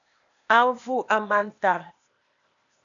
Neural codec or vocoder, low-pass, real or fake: codec, 16 kHz, 0.8 kbps, ZipCodec; 7.2 kHz; fake